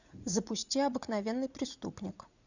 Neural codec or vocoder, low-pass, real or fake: vocoder, 44.1 kHz, 80 mel bands, Vocos; 7.2 kHz; fake